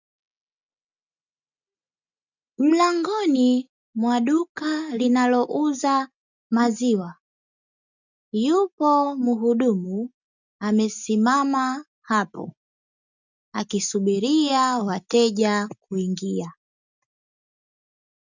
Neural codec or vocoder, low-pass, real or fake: none; 7.2 kHz; real